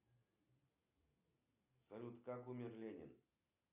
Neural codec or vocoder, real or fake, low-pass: none; real; 3.6 kHz